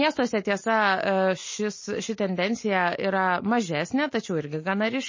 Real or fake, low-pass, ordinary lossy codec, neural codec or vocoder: real; 7.2 kHz; MP3, 32 kbps; none